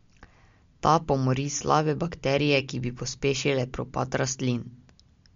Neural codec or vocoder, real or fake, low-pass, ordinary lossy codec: none; real; 7.2 kHz; MP3, 48 kbps